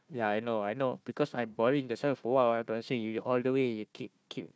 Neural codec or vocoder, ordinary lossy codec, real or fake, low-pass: codec, 16 kHz, 1 kbps, FunCodec, trained on Chinese and English, 50 frames a second; none; fake; none